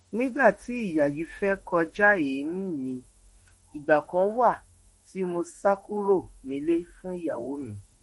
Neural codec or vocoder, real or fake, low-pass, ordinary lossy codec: autoencoder, 48 kHz, 32 numbers a frame, DAC-VAE, trained on Japanese speech; fake; 19.8 kHz; MP3, 48 kbps